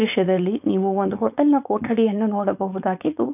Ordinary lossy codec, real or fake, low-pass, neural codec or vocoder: none; fake; 3.6 kHz; codec, 16 kHz, 4.8 kbps, FACodec